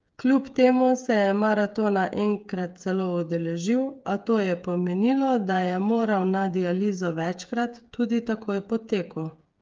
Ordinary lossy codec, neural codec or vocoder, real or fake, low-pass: Opus, 24 kbps; codec, 16 kHz, 8 kbps, FreqCodec, smaller model; fake; 7.2 kHz